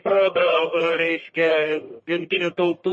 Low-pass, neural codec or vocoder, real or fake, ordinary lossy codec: 10.8 kHz; codec, 44.1 kHz, 1.7 kbps, Pupu-Codec; fake; MP3, 32 kbps